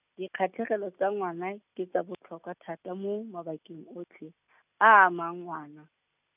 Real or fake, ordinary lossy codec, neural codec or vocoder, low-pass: real; none; none; 3.6 kHz